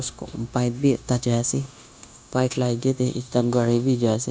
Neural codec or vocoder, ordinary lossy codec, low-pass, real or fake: codec, 16 kHz, 0.9 kbps, LongCat-Audio-Codec; none; none; fake